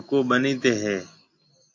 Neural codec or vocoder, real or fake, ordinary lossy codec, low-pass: none; real; AAC, 48 kbps; 7.2 kHz